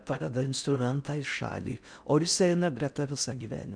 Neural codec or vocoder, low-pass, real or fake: codec, 16 kHz in and 24 kHz out, 0.6 kbps, FocalCodec, streaming, 4096 codes; 9.9 kHz; fake